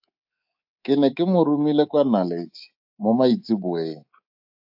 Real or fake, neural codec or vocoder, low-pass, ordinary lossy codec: fake; codec, 24 kHz, 3.1 kbps, DualCodec; 5.4 kHz; AAC, 48 kbps